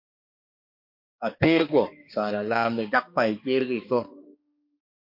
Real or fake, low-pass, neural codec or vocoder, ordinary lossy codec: fake; 5.4 kHz; codec, 16 kHz, 2 kbps, X-Codec, HuBERT features, trained on balanced general audio; MP3, 32 kbps